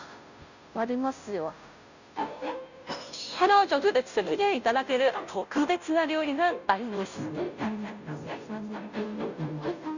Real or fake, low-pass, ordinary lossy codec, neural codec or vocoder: fake; 7.2 kHz; none; codec, 16 kHz, 0.5 kbps, FunCodec, trained on Chinese and English, 25 frames a second